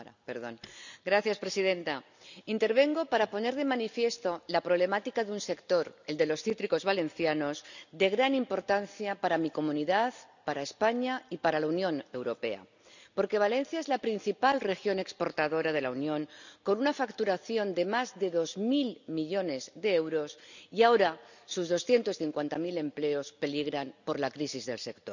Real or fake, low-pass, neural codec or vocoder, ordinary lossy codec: real; 7.2 kHz; none; none